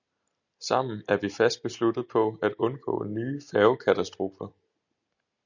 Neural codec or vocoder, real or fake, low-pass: none; real; 7.2 kHz